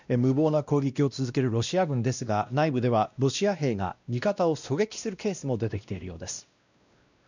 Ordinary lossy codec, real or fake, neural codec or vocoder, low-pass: none; fake; codec, 16 kHz, 1 kbps, X-Codec, WavLM features, trained on Multilingual LibriSpeech; 7.2 kHz